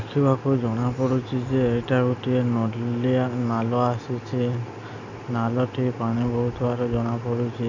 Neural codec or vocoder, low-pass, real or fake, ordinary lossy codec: none; 7.2 kHz; real; none